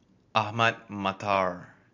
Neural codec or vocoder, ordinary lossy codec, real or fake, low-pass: none; AAC, 48 kbps; real; 7.2 kHz